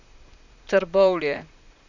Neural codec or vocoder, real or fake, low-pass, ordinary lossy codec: vocoder, 44.1 kHz, 128 mel bands, Pupu-Vocoder; fake; 7.2 kHz; none